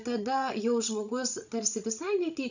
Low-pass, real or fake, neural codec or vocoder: 7.2 kHz; fake; codec, 16 kHz, 16 kbps, FreqCodec, larger model